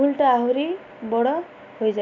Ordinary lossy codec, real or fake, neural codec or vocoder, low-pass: none; real; none; 7.2 kHz